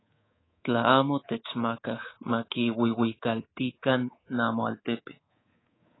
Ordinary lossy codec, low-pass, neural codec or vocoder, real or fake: AAC, 16 kbps; 7.2 kHz; codec, 24 kHz, 3.1 kbps, DualCodec; fake